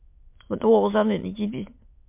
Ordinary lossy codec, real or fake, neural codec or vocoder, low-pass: MP3, 32 kbps; fake; autoencoder, 22.05 kHz, a latent of 192 numbers a frame, VITS, trained on many speakers; 3.6 kHz